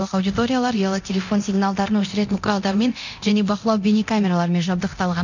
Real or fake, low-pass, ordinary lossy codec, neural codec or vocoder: fake; 7.2 kHz; none; codec, 24 kHz, 0.9 kbps, DualCodec